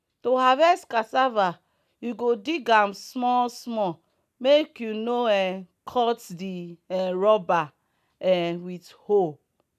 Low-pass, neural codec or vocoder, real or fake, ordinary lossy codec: 14.4 kHz; none; real; none